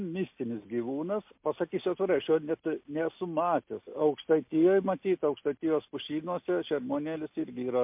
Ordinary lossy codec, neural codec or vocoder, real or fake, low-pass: MP3, 32 kbps; none; real; 3.6 kHz